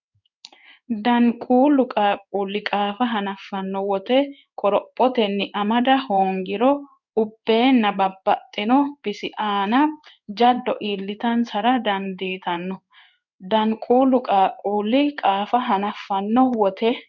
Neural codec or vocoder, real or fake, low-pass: codec, 16 kHz in and 24 kHz out, 1 kbps, XY-Tokenizer; fake; 7.2 kHz